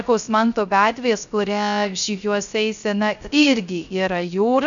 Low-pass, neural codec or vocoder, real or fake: 7.2 kHz; codec, 16 kHz, 0.3 kbps, FocalCodec; fake